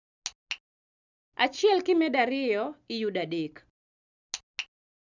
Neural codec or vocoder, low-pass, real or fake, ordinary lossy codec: none; 7.2 kHz; real; none